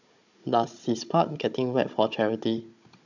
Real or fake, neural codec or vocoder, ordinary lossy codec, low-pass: fake; codec, 16 kHz, 16 kbps, FunCodec, trained on Chinese and English, 50 frames a second; none; 7.2 kHz